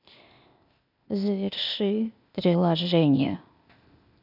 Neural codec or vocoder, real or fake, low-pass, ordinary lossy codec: codec, 16 kHz, 0.8 kbps, ZipCodec; fake; 5.4 kHz; none